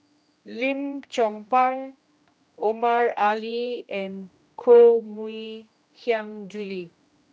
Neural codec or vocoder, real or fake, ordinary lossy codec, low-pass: codec, 16 kHz, 1 kbps, X-Codec, HuBERT features, trained on general audio; fake; none; none